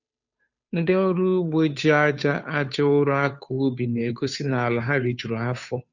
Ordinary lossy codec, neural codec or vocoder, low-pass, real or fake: none; codec, 16 kHz, 2 kbps, FunCodec, trained on Chinese and English, 25 frames a second; 7.2 kHz; fake